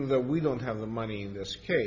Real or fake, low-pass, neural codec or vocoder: real; 7.2 kHz; none